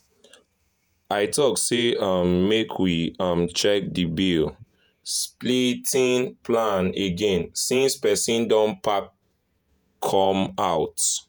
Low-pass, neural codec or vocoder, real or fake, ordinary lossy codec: none; vocoder, 48 kHz, 128 mel bands, Vocos; fake; none